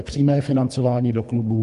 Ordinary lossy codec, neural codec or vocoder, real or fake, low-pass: MP3, 64 kbps; codec, 24 kHz, 3 kbps, HILCodec; fake; 10.8 kHz